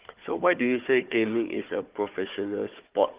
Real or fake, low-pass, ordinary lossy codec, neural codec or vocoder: fake; 3.6 kHz; Opus, 24 kbps; codec, 16 kHz, 4 kbps, FunCodec, trained on Chinese and English, 50 frames a second